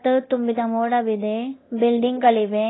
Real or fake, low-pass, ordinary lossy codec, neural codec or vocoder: fake; 7.2 kHz; AAC, 16 kbps; codec, 24 kHz, 0.9 kbps, DualCodec